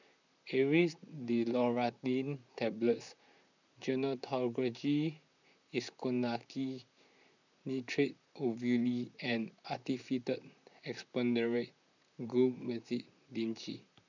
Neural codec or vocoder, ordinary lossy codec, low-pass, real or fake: vocoder, 44.1 kHz, 128 mel bands, Pupu-Vocoder; none; 7.2 kHz; fake